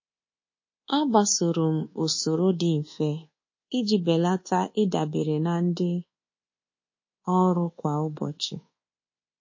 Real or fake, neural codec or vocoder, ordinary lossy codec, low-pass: fake; codec, 24 kHz, 1.2 kbps, DualCodec; MP3, 32 kbps; 7.2 kHz